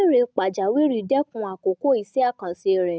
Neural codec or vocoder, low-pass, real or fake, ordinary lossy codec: none; none; real; none